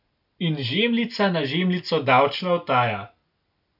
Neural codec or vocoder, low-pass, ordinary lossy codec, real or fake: none; 5.4 kHz; none; real